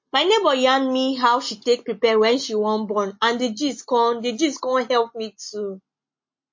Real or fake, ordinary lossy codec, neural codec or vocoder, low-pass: real; MP3, 32 kbps; none; 7.2 kHz